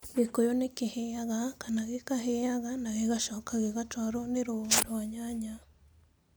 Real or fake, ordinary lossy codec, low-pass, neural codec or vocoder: real; none; none; none